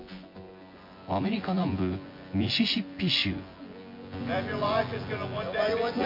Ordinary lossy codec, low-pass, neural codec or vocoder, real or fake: MP3, 32 kbps; 5.4 kHz; vocoder, 24 kHz, 100 mel bands, Vocos; fake